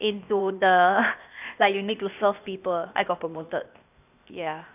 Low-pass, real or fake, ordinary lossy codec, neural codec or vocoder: 3.6 kHz; fake; none; codec, 16 kHz, 0.8 kbps, ZipCodec